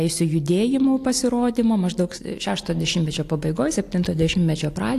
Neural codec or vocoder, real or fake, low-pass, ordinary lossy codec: none; real; 14.4 kHz; AAC, 64 kbps